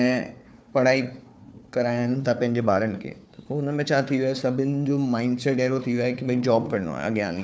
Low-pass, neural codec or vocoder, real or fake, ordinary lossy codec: none; codec, 16 kHz, 4 kbps, FunCodec, trained on Chinese and English, 50 frames a second; fake; none